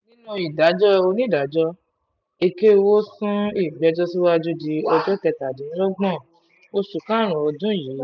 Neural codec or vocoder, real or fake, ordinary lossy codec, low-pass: none; real; none; 7.2 kHz